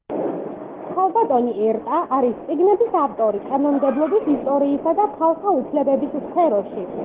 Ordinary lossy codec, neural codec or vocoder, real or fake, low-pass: Opus, 16 kbps; none; real; 3.6 kHz